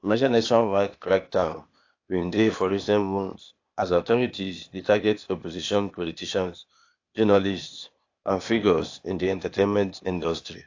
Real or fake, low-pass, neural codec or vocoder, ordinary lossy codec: fake; 7.2 kHz; codec, 16 kHz, 0.8 kbps, ZipCodec; AAC, 48 kbps